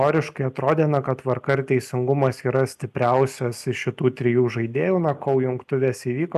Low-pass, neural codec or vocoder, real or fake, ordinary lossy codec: 14.4 kHz; none; real; AAC, 96 kbps